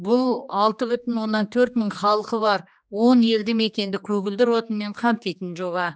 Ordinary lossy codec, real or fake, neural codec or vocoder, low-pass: none; fake; codec, 16 kHz, 2 kbps, X-Codec, HuBERT features, trained on general audio; none